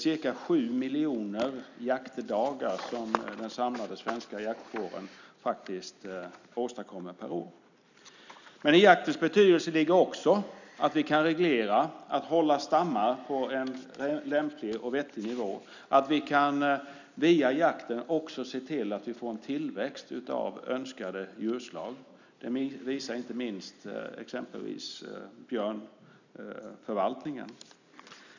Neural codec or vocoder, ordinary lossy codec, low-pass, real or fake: none; none; 7.2 kHz; real